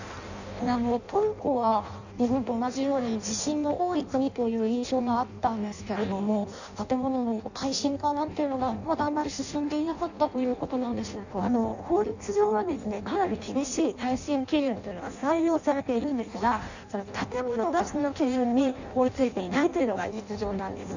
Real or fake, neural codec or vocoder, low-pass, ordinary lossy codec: fake; codec, 16 kHz in and 24 kHz out, 0.6 kbps, FireRedTTS-2 codec; 7.2 kHz; none